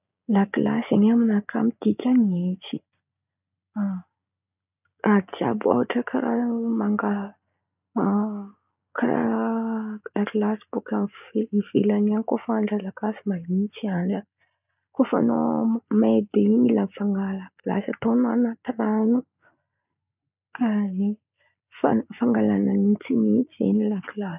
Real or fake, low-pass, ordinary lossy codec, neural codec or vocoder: real; 3.6 kHz; none; none